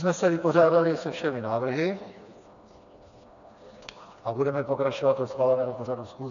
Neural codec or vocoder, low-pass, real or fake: codec, 16 kHz, 2 kbps, FreqCodec, smaller model; 7.2 kHz; fake